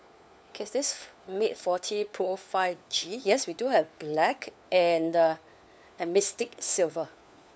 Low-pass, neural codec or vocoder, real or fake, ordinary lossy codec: none; codec, 16 kHz, 4 kbps, FunCodec, trained on LibriTTS, 50 frames a second; fake; none